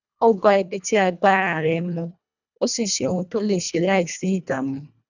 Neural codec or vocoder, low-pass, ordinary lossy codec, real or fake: codec, 24 kHz, 1.5 kbps, HILCodec; 7.2 kHz; none; fake